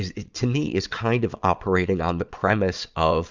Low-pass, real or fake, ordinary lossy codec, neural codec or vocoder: 7.2 kHz; fake; Opus, 64 kbps; vocoder, 22.05 kHz, 80 mel bands, Vocos